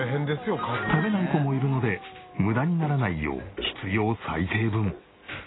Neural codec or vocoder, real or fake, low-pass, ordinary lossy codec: none; real; 7.2 kHz; AAC, 16 kbps